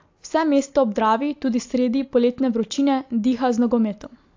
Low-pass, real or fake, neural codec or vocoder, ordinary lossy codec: 7.2 kHz; real; none; AAC, 48 kbps